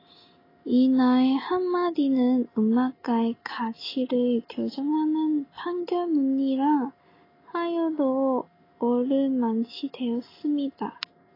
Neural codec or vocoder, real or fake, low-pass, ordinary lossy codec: none; real; 5.4 kHz; AAC, 24 kbps